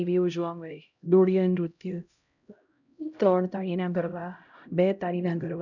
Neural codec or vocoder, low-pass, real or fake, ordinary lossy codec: codec, 16 kHz, 0.5 kbps, X-Codec, HuBERT features, trained on LibriSpeech; 7.2 kHz; fake; none